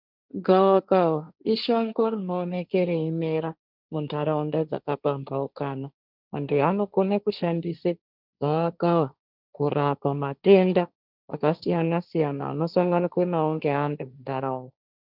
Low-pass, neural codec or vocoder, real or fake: 5.4 kHz; codec, 16 kHz, 1.1 kbps, Voila-Tokenizer; fake